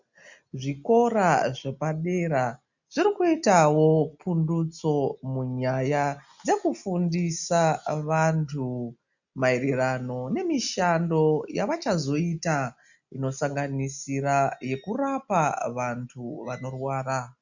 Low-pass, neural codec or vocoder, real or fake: 7.2 kHz; none; real